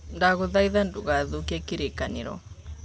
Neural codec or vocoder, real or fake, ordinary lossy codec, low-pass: none; real; none; none